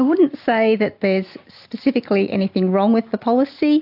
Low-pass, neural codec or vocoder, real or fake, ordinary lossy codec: 5.4 kHz; none; real; AAC, 48 kbps